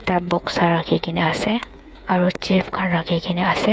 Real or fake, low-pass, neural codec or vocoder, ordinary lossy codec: fake; none; codec, 16 kHz, 8 kbps, FreqCodec, smaller model; none